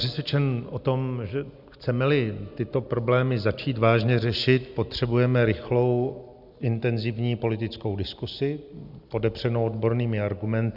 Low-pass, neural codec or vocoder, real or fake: 5.4 kHz; none; real